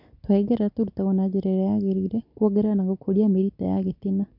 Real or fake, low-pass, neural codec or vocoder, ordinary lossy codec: real; 5.4 kHz; none; none